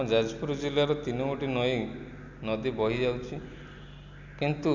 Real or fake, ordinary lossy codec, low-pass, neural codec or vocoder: real; Opus, 64 kbps; 7.2 kHz; none